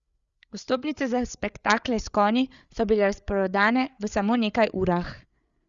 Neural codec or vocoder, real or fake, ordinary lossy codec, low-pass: codec, 16 kHz, 8 kbps, FreqCodec, larger model; fake; Opus, 64 kbps; 7.2 kHz